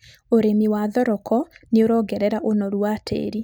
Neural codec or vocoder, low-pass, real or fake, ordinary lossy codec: none; none; real; none